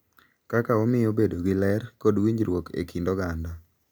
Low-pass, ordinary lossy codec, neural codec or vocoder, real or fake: none; none; none; real